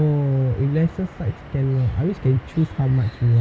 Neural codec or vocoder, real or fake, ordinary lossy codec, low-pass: none; real; none; none